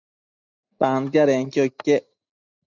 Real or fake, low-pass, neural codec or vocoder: real; 7.2 kHz; none